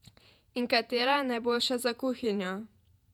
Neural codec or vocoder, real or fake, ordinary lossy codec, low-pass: vocoder, 48 kHz, 128 mel bands, Vocos; fake; none; 19.8 kHz